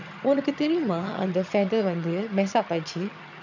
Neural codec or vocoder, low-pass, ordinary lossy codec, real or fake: vocoder, 22.05 kHz, 80 mel bands, HiFi-GAN; 7.2 kHz; none; fake